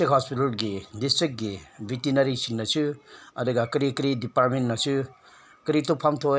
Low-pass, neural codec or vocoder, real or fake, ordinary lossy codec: none; none; real; none